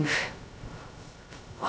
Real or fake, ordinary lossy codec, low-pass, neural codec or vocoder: fake; none; none; codec, 16 kHz, 0.2 kbps, FocalCodec